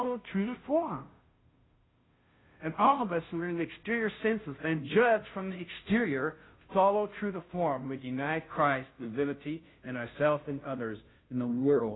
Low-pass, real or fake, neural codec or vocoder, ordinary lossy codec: 7.2 kHz; fake; codec, 16 kHz, 0.5 kbps, FunCodec, trained on Chinese and English, 25 frames a second; AAC, 16 kbps